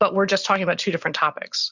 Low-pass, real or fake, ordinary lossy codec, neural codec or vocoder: 7.2 kHz; real; Opus, 64 kbps; none